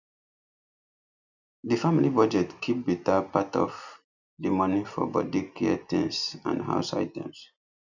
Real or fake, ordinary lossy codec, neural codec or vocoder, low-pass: real; none; none; 7.2 kHz